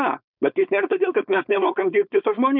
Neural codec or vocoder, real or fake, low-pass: codec, 16 kHz, 4.8 kbps, FACodec; fake; 5.4 kHz